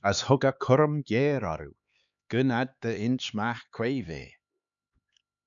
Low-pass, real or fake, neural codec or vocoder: 7.2 kHz; fake; codec, 16 kHz, 4 kbps, X-Codec, HuBERT features, trained on LibriSpeech